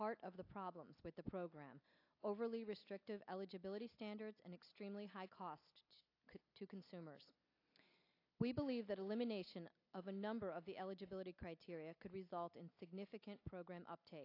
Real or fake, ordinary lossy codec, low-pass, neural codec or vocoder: real; AAC, 32 kbps; 5.4 kHz; none